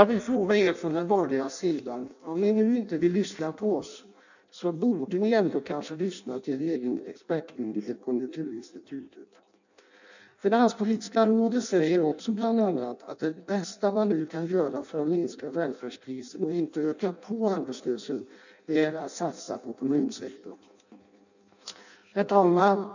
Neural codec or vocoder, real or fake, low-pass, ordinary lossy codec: codec, 16 kHz in and 24 kHz out, 0.6 kbps, FireRedTTS-2 codec; fake; 7.2 kHz; none